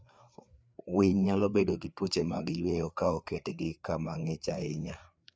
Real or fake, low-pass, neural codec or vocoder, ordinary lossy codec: fake; none; codec, 16 kHz, 4 kbps, FreqCodec, larger model; none